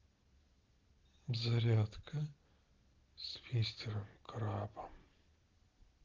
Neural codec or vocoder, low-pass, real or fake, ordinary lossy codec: none; 7.2 kHz; real; Opus, 16 kbps